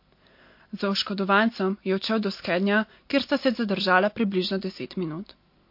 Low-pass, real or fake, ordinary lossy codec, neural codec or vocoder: 5.4 kHz; real; MP3, 32 kbps; none